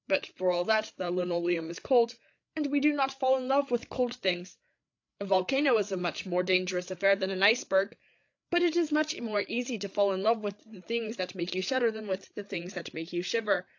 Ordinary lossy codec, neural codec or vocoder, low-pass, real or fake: AAC, 48 kbps; codec, 16 kHz, 8 kbps, FreqCodec, larger model; 7.2 kHz; fake